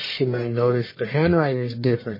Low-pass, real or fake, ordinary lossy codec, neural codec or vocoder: 5.4 kHz; fake; MP3, 24 kbps; codec, 44.1 kHz, 1.7 kbps, Pupu-Codec